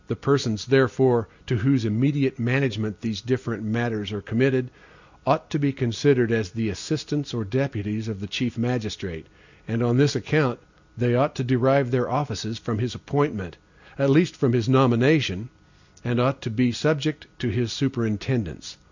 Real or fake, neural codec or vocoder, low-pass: real; none; 7.2 kHz